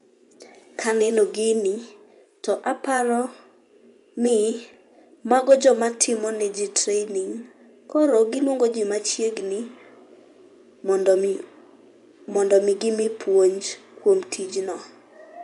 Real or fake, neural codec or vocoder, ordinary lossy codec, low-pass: fake; vocoder, 24 kHz, 100 mel bands, Vocos; none; 10.8 kHz